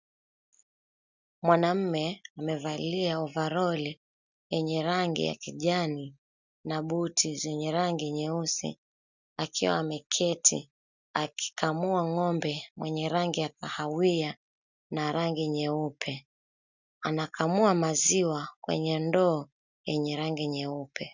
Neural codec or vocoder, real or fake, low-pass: none; real; 7.2 kHz